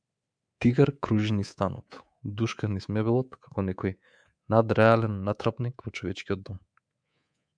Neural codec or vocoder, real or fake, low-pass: codec, 24 kHz, 3.1 kbps, DualCodec; fake; 9.9 kHz